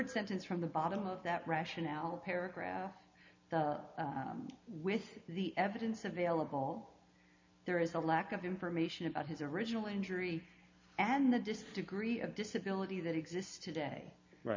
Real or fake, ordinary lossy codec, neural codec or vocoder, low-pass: real; MP3, 64 kbps; none; 7.2 kHz